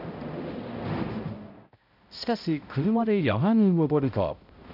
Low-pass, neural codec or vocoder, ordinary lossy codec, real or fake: 5.4 kHz; codec, 16 kHz, 0.5 kbps, X-Codec, HuBERT features, trained on balanced general audio; none; fake